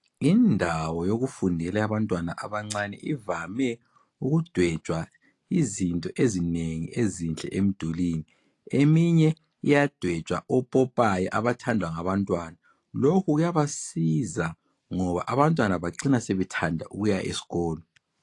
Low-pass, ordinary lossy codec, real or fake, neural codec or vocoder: 10.8 kHz; AAC, 48 kbps; real; none